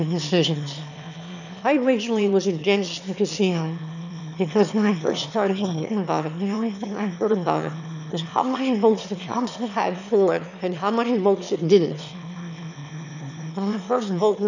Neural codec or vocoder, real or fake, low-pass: autoencoder, 22.05 kHz, a latent of 192 numbers a frame, VITS, trained on one speaker; fake; 7.2 kHz